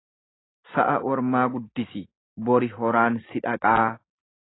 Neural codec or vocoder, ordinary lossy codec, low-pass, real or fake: none; AAC, 16 kbps; 7.2 kHz; real